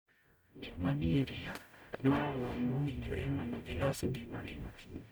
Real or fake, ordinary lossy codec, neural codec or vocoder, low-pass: fake; none; codec, 44.1 kHz, 0.9 kbps, DAC; none